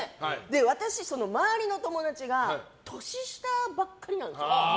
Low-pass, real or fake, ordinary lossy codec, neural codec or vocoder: none; real; none; none